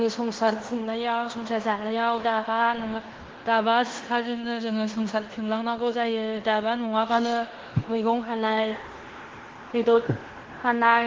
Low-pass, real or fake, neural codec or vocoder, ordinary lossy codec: 7.2 kHz; fake; codec, 16 kHz in and 24 kHz out, 0.9 kbps, LongCat-Audio-Codec, fine tuned four codebook decoder; Opus, 16 kbps